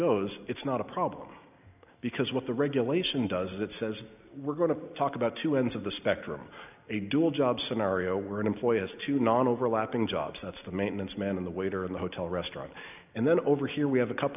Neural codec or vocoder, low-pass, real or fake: none; 3.6 kHz; real